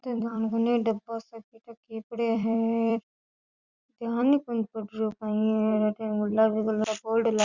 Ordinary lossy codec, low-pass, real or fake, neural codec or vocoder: Opus, 64 kbps; 7.2 kHz; fake; vocoder, 44.1 kHz, 128 mel bands every 256 samples, BigVGAN v2